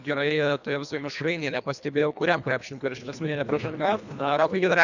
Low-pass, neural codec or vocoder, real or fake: 7.2 kHz; codec, 24 kHz, 1.5 kbps, HILCodec; fake